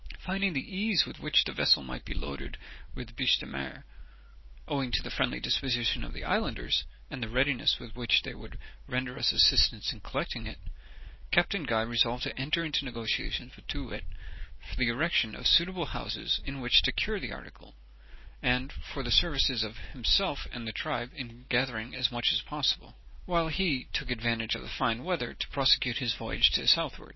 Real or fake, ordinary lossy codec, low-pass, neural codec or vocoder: real; MP3, 24 kbps; 7.2 kHz; none